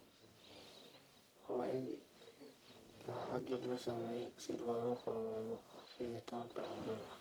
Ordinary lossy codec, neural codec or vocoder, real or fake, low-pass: none; codec, 44.1 kHz, 1.7 kbps, Pupu-Codec; fake; none